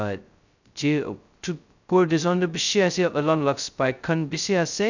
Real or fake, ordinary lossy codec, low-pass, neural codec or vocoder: fake; none; 7.2 kHz; codec, 16 kHz, 0.2 kbps, FocalCodec